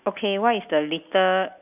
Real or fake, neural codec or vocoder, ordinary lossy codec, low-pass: real; none; none; 3.6 kHz